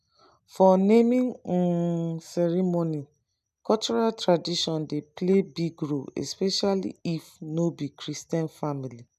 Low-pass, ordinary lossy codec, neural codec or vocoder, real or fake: 14.4 kHz; none; none; real